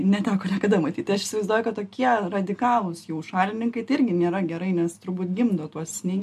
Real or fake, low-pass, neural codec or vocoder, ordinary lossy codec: real; 14.4 kHz; none; MP3, 64 kbps